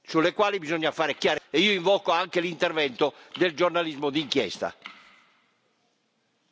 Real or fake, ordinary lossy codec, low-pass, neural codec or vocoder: real; none; none; none